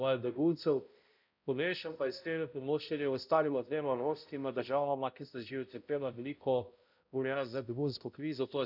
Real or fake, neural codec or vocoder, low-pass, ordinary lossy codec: fake; codec, 16 kHz, 0.5 kbps, X-Codec, HuBERT features, trained on balanced general audio; 5.4 kHz; none